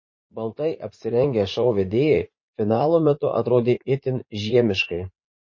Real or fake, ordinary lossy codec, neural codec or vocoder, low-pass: fake; MP3, 32 kbps; vocoder, 44.1 kHz, 80 mel bands, Vocos; 7.2 kHz